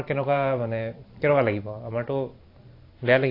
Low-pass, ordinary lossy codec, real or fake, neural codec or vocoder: 5.4 kHz; AAC, 32 kbps; fake; vocoder, 44.1 kHz, 128 mel bands every 512 samples, BigVGAN v2